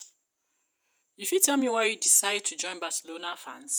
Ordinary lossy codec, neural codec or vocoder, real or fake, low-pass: none; vocoder, 48 kHz, 128 mel bands, Vocos; fake; none